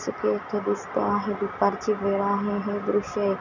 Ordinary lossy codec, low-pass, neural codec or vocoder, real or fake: none; 7.2 kHz; none; real